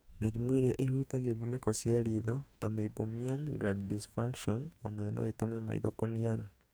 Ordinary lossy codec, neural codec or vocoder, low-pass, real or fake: none; codec, 44.1 kHz, 2.6 kbps, DAC; none; fake